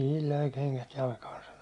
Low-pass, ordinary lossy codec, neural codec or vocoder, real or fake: 10.8 kHz; AAC, 48 kbps; none; real